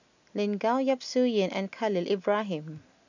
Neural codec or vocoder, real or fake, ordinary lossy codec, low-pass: none; real; none; 7.2 kHz